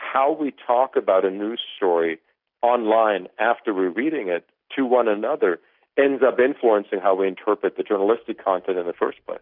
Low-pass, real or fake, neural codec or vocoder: 5.4 kHz; real; none